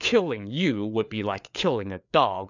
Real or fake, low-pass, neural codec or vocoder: fake; 7.2 kHz; codec, 16 kHz, 4 kbps, FreqCodec, larger model